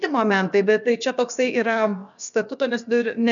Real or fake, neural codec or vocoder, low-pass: fake; codec, 16 kHz, about 1 kbps, DyCAST, with the encoder's durations; 7.2 kHz